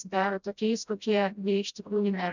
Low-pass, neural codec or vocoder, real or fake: 7.2 kHz; codec, 16 kHz, 0.5 kbps, FreqCodec, smaller model; fake